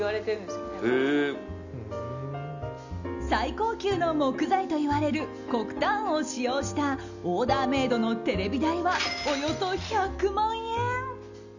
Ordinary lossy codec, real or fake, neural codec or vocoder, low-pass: none; real; none; 7.2 kHz